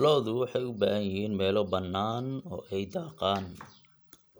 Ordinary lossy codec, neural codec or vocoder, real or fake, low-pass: none; none; real; none